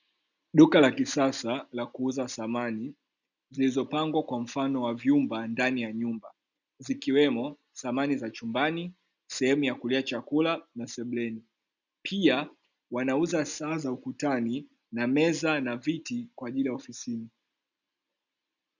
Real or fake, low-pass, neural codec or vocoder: real; 7.2 kHz; none